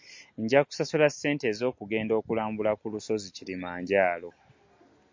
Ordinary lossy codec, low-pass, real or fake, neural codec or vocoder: MP3, 48 kbps; 7.2 kHz; real; none